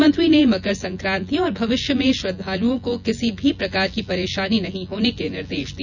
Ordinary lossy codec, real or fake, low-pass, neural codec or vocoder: none; fake; 7.2 kHz; vocoder, 24 kHz, 100 mel bands, Vocos